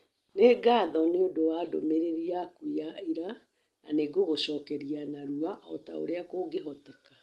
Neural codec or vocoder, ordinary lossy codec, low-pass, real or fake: none; Opus, 24 kbps; 14.4 kHz; real